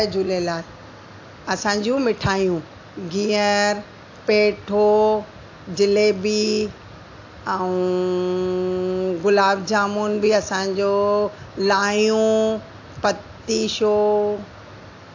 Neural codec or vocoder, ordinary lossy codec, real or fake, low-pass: none; AAC, 48 kbps; real; 7.2 kHz